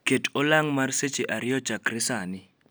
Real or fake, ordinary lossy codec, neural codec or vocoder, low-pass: real; none; none; none